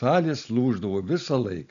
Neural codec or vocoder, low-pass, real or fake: none; 7.2 kHz; real